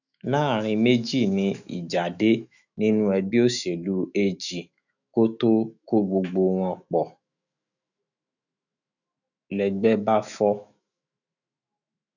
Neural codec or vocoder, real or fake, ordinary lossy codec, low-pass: autoencoder, 48 kHz, 128 numbers a frame, DAC-VAE, trained on Japanese speech; fake; none; 7.2 kHz